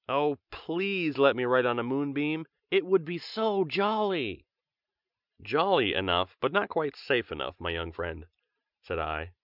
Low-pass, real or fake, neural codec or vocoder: 5.4 kHz; real; none